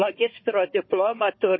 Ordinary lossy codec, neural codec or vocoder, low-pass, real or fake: MP3, 24 kbps; codec, 16 kHz, 4 kbps, FunCodec, trained on Chinese and English, 50 frames a second; 7.2 kHz; fake